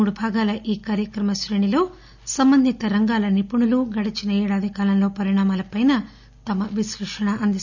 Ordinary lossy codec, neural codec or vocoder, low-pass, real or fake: none; none; 7.2 kHz; real